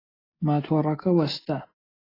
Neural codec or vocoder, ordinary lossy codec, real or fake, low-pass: none; AAC, 24 kbps; real; 5.4 kHz